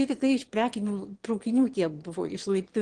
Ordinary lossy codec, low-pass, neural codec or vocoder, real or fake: Opus, 16 kbps; 9.9 kHz; autoencoder, 22.05 kHz, a latent of 192 numbers a frame, VITS, trained on one speaker; fake